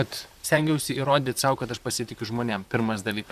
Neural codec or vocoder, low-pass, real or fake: vocoder, 44.1 kHz, 128 mel bands, Pupu-Vocoder; 14.4 kHz; fake